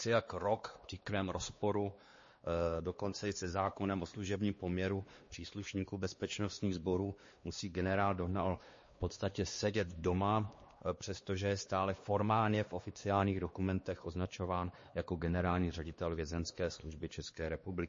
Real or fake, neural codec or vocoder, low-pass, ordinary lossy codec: fake; codec, 16 kHz, 2 kbps, X-Codec, WavLM features, trained on Multilingual LibriSpeech; 7.2 kHz; MP3, 32 kbps